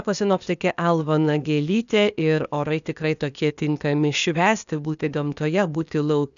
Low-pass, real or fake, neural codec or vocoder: 7.2 kHz; fake; codec, 16 kHz, 0.8 kbps, ZipCodec